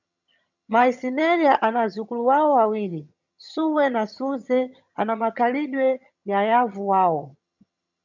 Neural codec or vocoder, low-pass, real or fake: vocoder, 22.05 kHz, 80 mel bands, HiFi-GAN; 7.2 kHz; fake